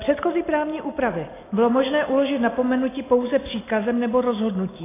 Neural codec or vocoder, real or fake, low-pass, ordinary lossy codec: none; real; 3.6 kHz; AAC, 16 kbps